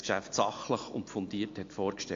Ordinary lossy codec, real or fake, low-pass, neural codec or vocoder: AAC, 48 kbps; real; 7.2 kHz; none